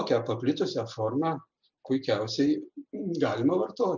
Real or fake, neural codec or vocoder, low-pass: real; none; 7.2 kHz